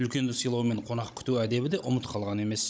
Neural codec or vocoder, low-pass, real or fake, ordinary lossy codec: codec, 16 kHz, 16 kbps, FunCodec, trained on Chinese and English, 50 frames a second; none; fake; none